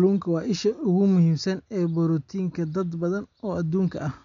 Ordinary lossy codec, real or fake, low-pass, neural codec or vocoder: MP3, 64 kbps; real; 7.2 kHz; none